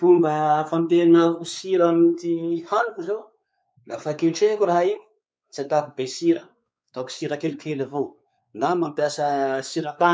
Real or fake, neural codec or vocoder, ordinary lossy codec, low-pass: fake; codec, 16 kHz, 4 kbps, X-Codec, WavLM features, trained on Multilingual LibriSpeech; none; none